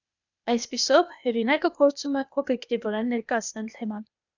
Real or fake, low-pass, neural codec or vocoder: fake; 7.2 kHz; codec, 16 kHz, 0.8 kbps, ZipCodec